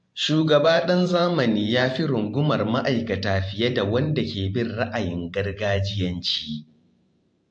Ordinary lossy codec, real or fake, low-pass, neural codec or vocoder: MP3, 48 kbps; fake; 9.9 kHz; vocoder, 44.1 kHz, 128 mel bands every 512 samples, BigVGAN v2